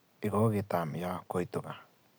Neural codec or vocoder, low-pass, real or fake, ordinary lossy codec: none; none; real; none